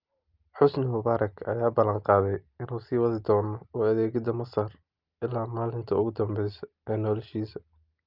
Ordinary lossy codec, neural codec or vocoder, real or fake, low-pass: Opus, 24 kbps; none; real; 5.4 kHz